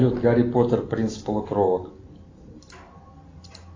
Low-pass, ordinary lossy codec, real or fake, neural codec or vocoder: 7.2 kHz; AAC, 32 kbps; real; none